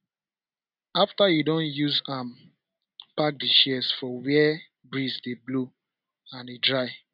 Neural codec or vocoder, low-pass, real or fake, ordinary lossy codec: none; 5.4 kHz; real; none